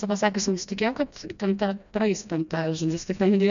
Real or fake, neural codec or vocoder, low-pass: fake; codec, 16 kHz, 1 kbps, FreqCodec, smaller model; 7.2 kHz